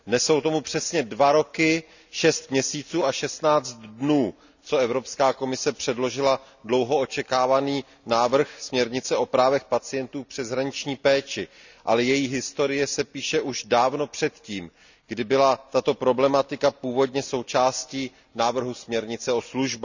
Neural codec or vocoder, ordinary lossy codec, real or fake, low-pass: none; none; real; 7.2 kHz